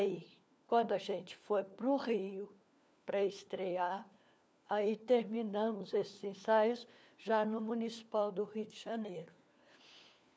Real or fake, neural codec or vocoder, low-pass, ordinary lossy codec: fake; codec, 16 kHz, 4 kbps, FunCodec, trained on LibriTTS, 50 frames a second; none; none